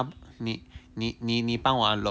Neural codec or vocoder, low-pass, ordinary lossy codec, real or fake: none; none; none; real